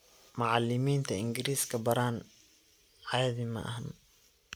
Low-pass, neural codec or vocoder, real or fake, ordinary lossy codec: none; none; real; none